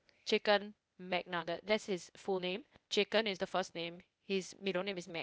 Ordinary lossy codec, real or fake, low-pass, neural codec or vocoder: none; fake; none; codec, 16 kHz, 0.8 kbps, ZipCodec